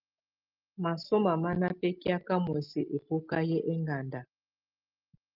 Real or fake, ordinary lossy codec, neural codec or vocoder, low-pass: real; Opus, 24 kbps; none; 5.4 kHz